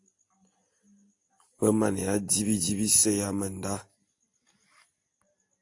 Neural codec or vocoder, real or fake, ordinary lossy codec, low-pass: none; real; AAC, 48 kbps; 10.8 kHz